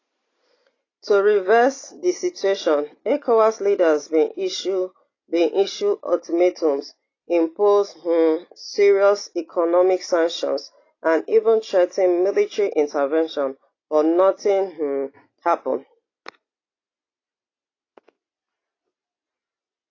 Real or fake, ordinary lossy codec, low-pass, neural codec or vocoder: real; AAC, 32 kbps; 7.2 kHz; none